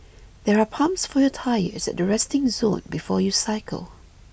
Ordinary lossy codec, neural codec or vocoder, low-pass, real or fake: none; none; none; real